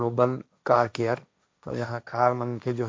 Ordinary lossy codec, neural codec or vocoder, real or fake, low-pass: none; codec, 16 kHz, 1.1 kbps, Voila-Tokenizer; fake; none